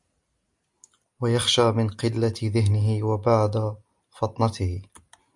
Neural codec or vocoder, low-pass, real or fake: none; 10.8 kHz; real